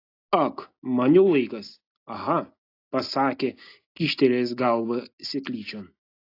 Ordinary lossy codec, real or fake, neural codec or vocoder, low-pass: AAC, 32 kbps; real; none; 5.4 kHz